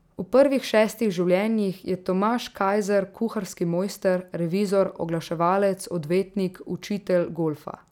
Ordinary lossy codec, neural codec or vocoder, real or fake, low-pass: none; none; real; 19.8 kHz